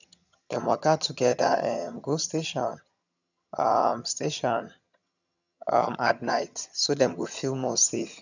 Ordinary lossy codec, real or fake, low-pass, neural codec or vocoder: none; fake; 7.2 kHz; vocoder, 22.05 kHz, 80 mel bands, HiFi-GAN